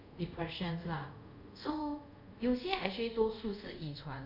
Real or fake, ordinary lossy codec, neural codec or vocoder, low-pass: fake; none; codec, 24 kHz, 0.5 kbps, DualCodec; 5.4 kHz